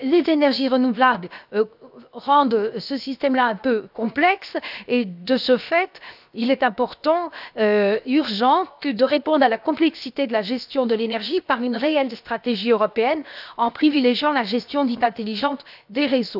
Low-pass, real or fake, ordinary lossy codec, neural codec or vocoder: 5.4 kHz; fake; none; codec, 16 kHz, 0.7 kbps, FocalCodec